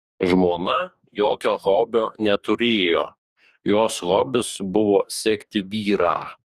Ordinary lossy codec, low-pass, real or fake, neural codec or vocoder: Opus, 64 kbps; 14.4 kHz; fake; codec, 44.1 kHz, 2.6 kbps, DAC